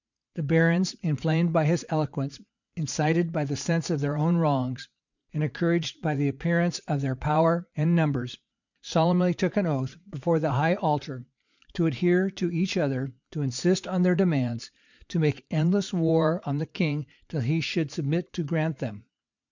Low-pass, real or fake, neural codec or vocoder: 7.2 kHz; fake; vocoder, 44.1 kHz, 80 mel bands, Vocos